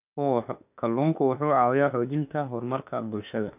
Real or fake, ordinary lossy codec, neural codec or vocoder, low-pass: fake; none; autoencoder, 48 kHz, 32 numbers a frame, DAC-VAE, trained on Japanese speech; 3.6 kHz